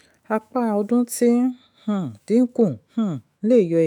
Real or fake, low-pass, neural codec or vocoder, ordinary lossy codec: fake; 19.8 kHz; autoencoder, 48 kHz, 128 numbers a frame, DAC-VAE, trained on Japanese speech; none